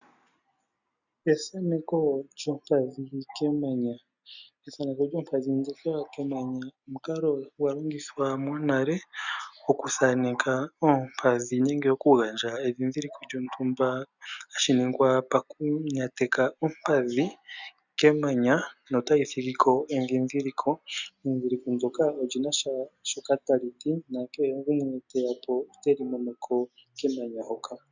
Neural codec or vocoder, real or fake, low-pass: none; real; 7.2 kHz